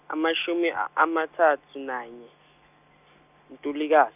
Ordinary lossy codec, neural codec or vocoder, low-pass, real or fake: none; none; 3.6 kHz; real